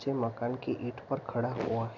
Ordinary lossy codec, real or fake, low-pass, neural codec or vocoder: none; real; 7.2 kHz; none